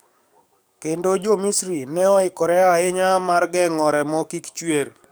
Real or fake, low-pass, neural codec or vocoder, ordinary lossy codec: fake; none; codec, 44.1 kHz, 7.8 kbps, DAC; none